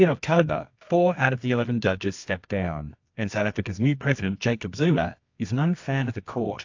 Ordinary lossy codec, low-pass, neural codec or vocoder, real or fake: AAC, 48 kbps; 7.2 kHz; codec, 24 kHz, 0.9 kbps, WavTokenizer, medium music audio release; fake